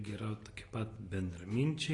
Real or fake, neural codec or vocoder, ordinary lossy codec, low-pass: real; none; AAC, 32 kbps; 10.8 kHz